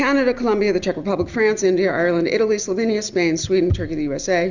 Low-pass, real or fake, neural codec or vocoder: 7.2 kHz; real; none